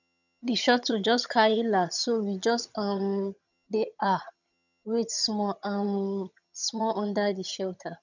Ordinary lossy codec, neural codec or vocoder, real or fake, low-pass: none; vocoder, 22.05 kHz, 80 mel bands, HiFi-GAN; fake; 7.2 kHz